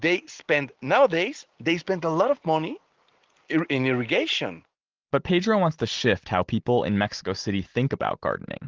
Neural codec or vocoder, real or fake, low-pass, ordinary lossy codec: none; real; 7.2 kHz; Opus, 16 kbps